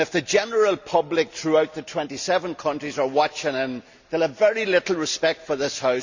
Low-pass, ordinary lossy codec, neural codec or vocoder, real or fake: 7.2 kHz; Opus, 64 kbps; none; real